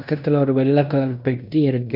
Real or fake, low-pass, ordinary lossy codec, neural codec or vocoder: fake; 5.4 kHz; none; codec, 16 kHz in and 24 kHz out, 0.9 kbps, LongCat-Audio-Codec, fine tuned four codebook decoder